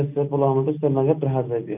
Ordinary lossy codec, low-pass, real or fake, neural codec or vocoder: none; 3.6 kHz; real; none